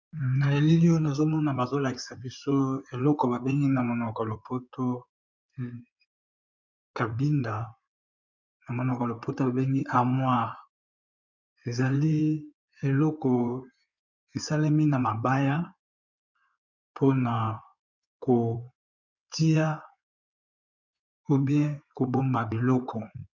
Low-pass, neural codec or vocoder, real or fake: 7.2 kHz; codec, 16 kHz in and 24 kHz out, 2.2 kbps, FireRedTTS-2 codec; fake